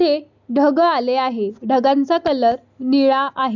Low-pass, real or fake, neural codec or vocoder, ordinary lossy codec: 7.2 kHz; real; none; none